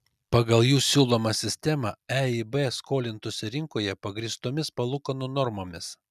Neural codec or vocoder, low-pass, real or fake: none; 14.4 kHz; real